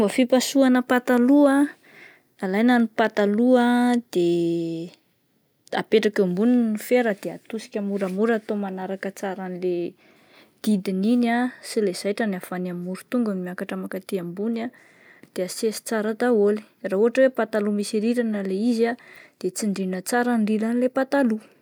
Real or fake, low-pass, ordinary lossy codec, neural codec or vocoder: real; none; none; none